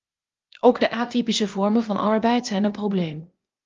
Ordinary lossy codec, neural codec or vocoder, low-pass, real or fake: Opus, 32 kbps; codec, 16 kHz, 0.8 kbps, ZipCodec; 7.2 kHz; fake